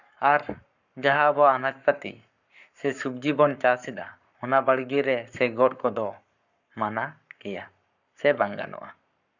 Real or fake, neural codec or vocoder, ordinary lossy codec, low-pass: fake; codec, 44.1 kHz, 7.8 kbps, Pupu-Codec; none; 7.2 kHz